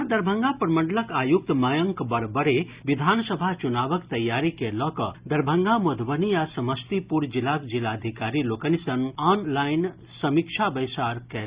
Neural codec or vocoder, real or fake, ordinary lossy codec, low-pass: none; real; Opus, 64 kbps; 3.6 kHz